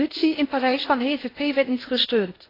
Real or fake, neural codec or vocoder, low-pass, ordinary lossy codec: fake; codec, 16 kHz in and 24 kHz out, 0.6 kbps, FocalCodec, streaming, 2048 codes; 5.4 kHz; AAC, 24 kbps